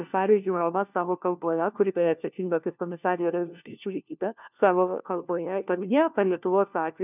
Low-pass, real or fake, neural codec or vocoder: 3.6 kHz; fake; codec, 16 kHz, 1 kbps, FunCodec, trained on LibriTTS, 50 frames a second